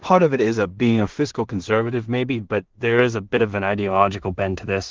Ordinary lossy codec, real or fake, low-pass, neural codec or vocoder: Opus, 16 kbps; fake; 7.2 kHz; codec, 16 kHz in and 24 kHz out, 0.4 kbps, LongCat-Audio-Codec, two codebook decoder